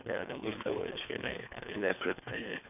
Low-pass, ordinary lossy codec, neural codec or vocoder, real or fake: 3.6 kHz; none; codec, 24 kHz, 3 kbps, HILCodec; fake